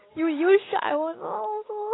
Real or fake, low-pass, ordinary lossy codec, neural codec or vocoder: real; 7.2 kHz; AAC, 16 kbps; none